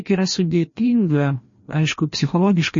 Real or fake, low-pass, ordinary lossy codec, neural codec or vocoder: fake; 7.2 kHz; MP3, 32 kbps; codec, 16 kHz, 1 kbps, FreqCodec, larger model